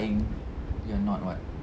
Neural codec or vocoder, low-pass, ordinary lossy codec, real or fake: none; none; none; real